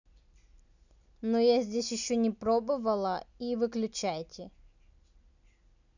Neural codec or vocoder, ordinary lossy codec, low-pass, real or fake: none; none; 7.2 kHz; real